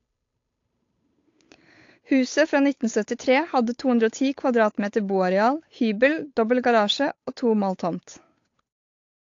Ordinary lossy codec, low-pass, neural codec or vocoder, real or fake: AAC, 48 kbps; 7.2 kHz; codec, 16 kHz, 8 kbps, FunCodec, trained on Chinese and English, 25 frames a second; fake